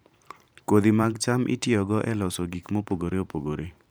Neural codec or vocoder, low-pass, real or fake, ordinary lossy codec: vocoder, 44.1 kHz, 128 mel bands every 512 samples, BigVGAN v2; none; fake; none